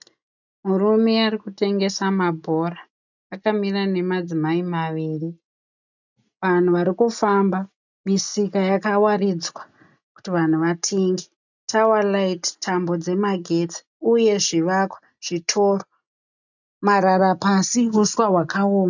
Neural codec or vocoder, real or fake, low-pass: none; real; 7.2 kHz